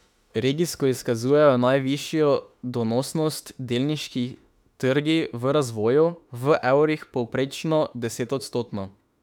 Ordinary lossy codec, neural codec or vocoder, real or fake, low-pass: none; autoencoder, 48 kHz, 32 numbers a frame, DAC-VAE, trained on Japanese speech; fake; 19.8 kHz